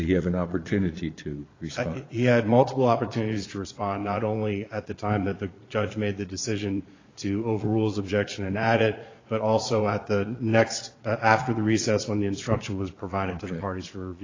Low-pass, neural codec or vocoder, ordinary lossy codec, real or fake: 7.2 kHz; vocoder, 22.05 kHz, 80 mel bands, Vocos; AAC, 32 kbps; fake